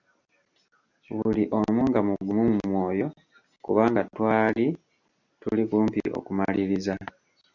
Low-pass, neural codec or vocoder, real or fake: 7.2 kHz; none; real